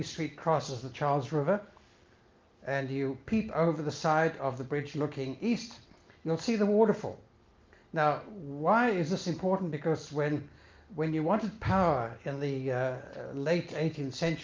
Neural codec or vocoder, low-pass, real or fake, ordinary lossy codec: none; 7.2 kHz; real; Opus, 24 kbps